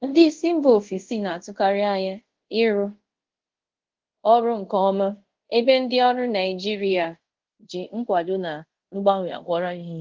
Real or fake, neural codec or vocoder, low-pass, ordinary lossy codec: fake; codec, 24 kHz, 0.5 kbps, DualCodec; 7.2 kHz; Opus, 16 kbps